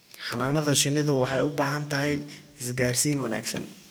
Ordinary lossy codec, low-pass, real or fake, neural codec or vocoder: none; none; fake; codec, 44.1 kHz, 2.6 kbps, DAC